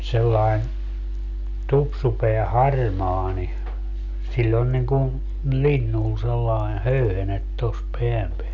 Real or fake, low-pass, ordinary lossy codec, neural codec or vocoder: real; 7.2 kHz; none; none